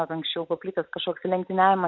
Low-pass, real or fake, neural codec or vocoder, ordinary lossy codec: 7.2 kHz; real; none; MP3, 64 kbps